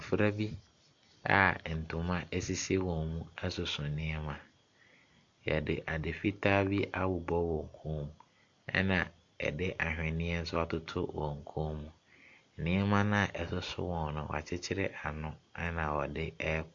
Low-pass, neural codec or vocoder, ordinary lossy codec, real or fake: 7.2 kHz; none; AAC, 64 kbps; real